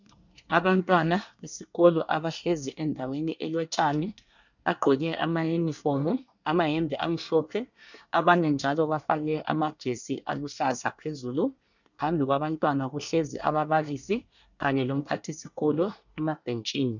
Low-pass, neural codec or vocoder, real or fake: 7.2 kHz; codec, 24 kHz, 1 kbps, SNAC; fake